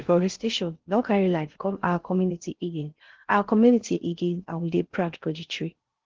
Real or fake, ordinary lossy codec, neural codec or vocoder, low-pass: fake; Opus, 16 kbps; codec, 16 kHz in and 24 kHz out, 0.6 kbps, FocalCodec, streaming, 4096 codes; 7.2 kHz